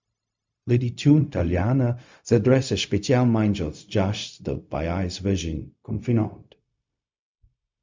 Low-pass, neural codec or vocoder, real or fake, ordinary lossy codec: 7.2 kHz; codec, 16 kHz, 0.4 kbps, LongCat-Audio-Codec; fake; MP3, 64 kbps